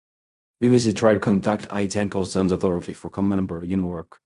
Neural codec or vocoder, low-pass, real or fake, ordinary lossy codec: codec, 16 kHz in and 24 kHz out, 0.4 kbps, LongCat-Audio-Codec, fine tuned four codebook decoder; 10.8 kHz; fake; none